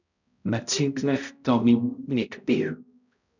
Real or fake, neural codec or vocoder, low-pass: fake; codec, 16 kHz, 0.5 kbps, X-Codec, HuBERT features, trained on balanced general audio; 7.2 kHz